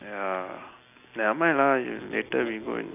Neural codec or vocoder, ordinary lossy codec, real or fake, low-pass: none; AAC, 32 kbps; real; 3.6 kHz